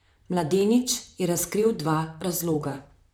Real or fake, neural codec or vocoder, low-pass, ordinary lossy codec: fake; vocoder, 44.1 kHz, 128 mel bands, Pupu-Vocoder; none; none